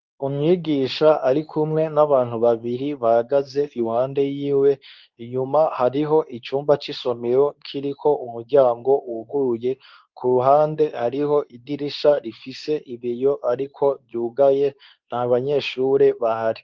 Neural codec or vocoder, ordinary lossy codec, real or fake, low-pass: codec, 24 kHz, 0.9 kbps, WavTokenizer, medium speech release version 2; Opus, 24 kbps; fake; 7.2 kHz